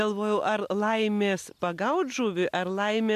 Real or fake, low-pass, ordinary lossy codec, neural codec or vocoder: real; 14.4 kHz; MP3, 96 kbps; none